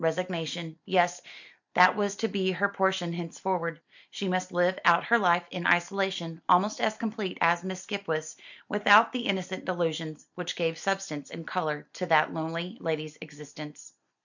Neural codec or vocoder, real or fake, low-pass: none; real; 7.2 kHz